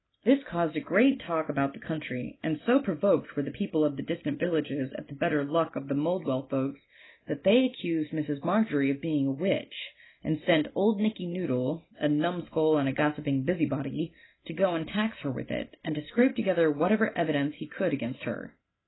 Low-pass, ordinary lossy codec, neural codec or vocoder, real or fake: 7.2 kHz; AAC, 16 kbps; none; real